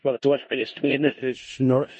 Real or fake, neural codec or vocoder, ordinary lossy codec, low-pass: fake; codec, 16 kHz in and 24 kHz out, 0.4 kbps, LongCat-Audio-Codec, four codebook decoder; MP3, 32 kbps; 10.8 kHz